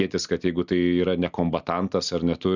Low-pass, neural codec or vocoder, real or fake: 7.2 kHz; none; real